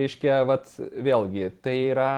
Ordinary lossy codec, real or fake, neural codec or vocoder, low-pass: Opus, 16 kbps; fake; vocoder, 44.1 kHz, 128 mel bands every 512 samples, BigVGAN v2; 19.8 kHz